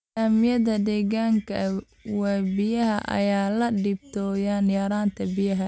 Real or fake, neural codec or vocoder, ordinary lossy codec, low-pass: real; none; none; none